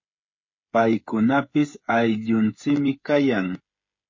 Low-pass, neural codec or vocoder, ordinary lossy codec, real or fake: 7.2 kHz; codec, 16 kHz, 8 kbps, FreqCodec, smaller model; MP3, 32 kbps; fake